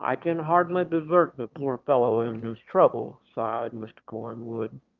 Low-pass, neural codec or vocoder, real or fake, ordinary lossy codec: 7.2 kHz; autoencoder, 22.05 kHz, a latent of 192 numbers a frame, VITS, trained on one speaker; fake; Opus, 24 kbps